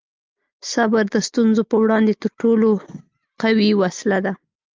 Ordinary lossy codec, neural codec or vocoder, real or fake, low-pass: Opus, 32 kbps; none; real; 7.2 kHz